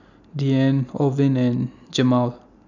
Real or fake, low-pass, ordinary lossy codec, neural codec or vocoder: real; 7.2 kHz; none; none